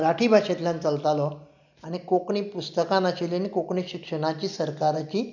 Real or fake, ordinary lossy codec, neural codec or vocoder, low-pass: real; none; none; 7.2 kHz